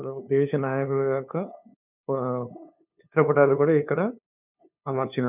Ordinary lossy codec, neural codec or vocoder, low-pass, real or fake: none; codec, 16 kHz, 2 kbps, FunCodec, trained on LibriTTS, 25 frames a second; 3.6 kHz; fake